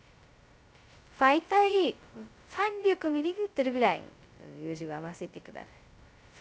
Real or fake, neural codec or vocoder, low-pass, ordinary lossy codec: fake; codec, 16 kHz, 0.2 kbps, FocalCodec; none; none